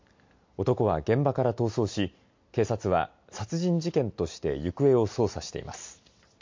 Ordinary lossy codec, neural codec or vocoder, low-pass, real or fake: MP3, 48 kbps; none; 7.2 kHz; real